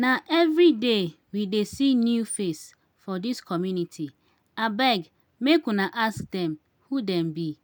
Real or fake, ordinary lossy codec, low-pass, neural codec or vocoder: real; none; none; none